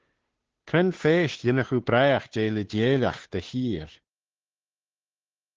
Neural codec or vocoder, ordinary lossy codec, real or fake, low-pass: codec, 16 kHz, 2 kbps, FunCodec, trained on Chinese and English, 25 frames a second; Opus, 16 kbps; fake; 7.2 kHz